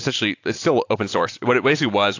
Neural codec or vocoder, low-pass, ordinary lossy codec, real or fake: none; 7.2 kHz; AAC, 48 kbps; real